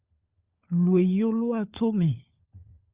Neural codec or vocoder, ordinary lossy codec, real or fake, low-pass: codec, 16 kHz, 16 kbps, FunCodec, trained on LibriTTS, 50 frames a second; Opus, 64 kbps; fake; 3.6 kHz